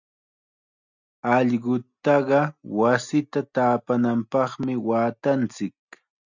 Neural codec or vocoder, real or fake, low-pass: none; real; 7.2 kHz